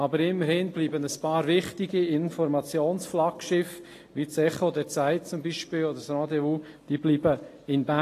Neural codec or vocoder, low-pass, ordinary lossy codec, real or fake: none; 14.4 kHz; AAC, 48 kbps; real